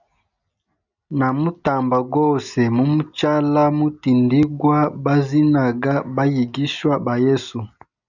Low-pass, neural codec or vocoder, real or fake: 7.2 kHz; none; real